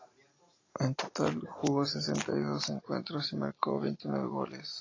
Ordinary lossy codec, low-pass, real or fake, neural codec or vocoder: AAC, 32 kbps; 7.2 kHz; real; none